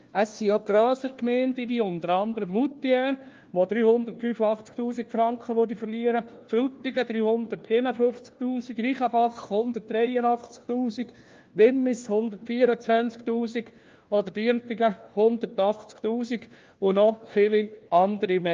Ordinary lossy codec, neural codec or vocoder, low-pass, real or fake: Opus, 24 kbps; codec, 16 kHz, 1 kbps, FunCodec, trained on LibriTTS, 50 frames a second; 7.2 kHz; fake